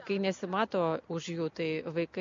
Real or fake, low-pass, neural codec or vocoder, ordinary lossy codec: real; 7.2 kHz; none; MP3, 48 kbps